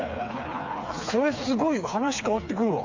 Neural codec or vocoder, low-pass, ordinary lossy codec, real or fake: codec, 16 kHz, 4 kbps, FreqCodec, smaller model; 7.2 kHz; MP3, 64 kbps; fake